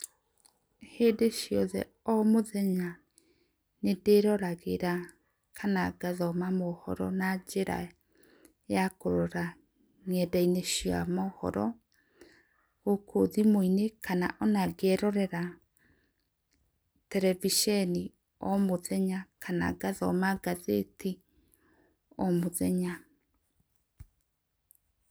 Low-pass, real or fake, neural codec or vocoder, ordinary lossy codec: none; real; none; none